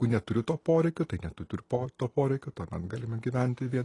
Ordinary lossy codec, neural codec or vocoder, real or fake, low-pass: AAC, 32 kbps; none; real; 10.8 kHz